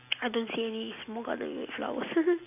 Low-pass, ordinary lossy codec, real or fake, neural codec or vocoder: 3.6 kHz; none; real; none